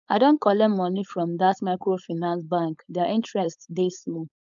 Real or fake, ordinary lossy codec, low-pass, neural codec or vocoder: fake; none; 7.2 kHz; codec, 16 kHz, 4.8 kbps, FACodec